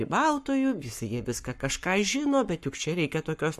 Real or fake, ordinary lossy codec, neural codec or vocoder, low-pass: fake; MP3, 64 kbps; codec, 44.1 kHz, 7.8 kbps, Pupu-Codec; 14.4 kHz